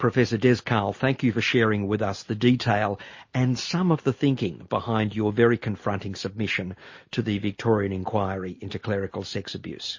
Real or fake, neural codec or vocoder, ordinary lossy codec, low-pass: real; none; MP3, 32 kbps; 7.2 kHz